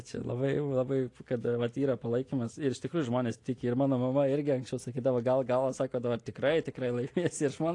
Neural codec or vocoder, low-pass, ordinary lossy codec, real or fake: none; 10.8 kHz; AAC, 48 kbps; real